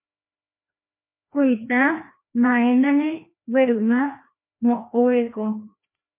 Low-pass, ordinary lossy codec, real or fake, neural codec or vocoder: 3.6 kHz; MP3, 24 kbps; fake; codec, 16 kHz, 1 kbps, FreqCodec, larger model